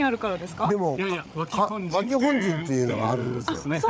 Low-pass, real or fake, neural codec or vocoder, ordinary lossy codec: none; fake; codec, 16 kHz, 8 kbps, FreqCodec, larger model; none